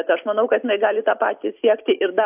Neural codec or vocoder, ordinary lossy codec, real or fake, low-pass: none; AAC, 32 kbps; real; 3.6 kHz